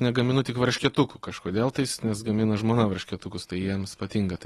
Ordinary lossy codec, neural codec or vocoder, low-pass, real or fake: AAC, 32 kbps; none; 19.8 kHz; real